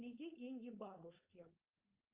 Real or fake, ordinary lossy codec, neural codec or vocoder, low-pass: fake; Opus, 32 kbps; codec, 16 kHz, 4.8 kbps, FACodec; 3.6 kHz